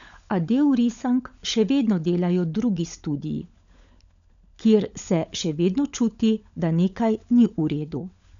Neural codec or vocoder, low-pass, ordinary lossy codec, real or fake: codec, 16 kHz, 16 kbps, FunCodec, trained on LibriTTS, 50 frames a second; 7.2 kHz; MP3, 96 kbps; fake